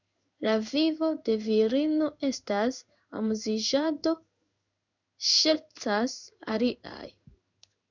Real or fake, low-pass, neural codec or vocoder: fake; 7.2 kHz; codec, 16 kHz in and 24 kHz out, 1 kbps, XY-Tokenizer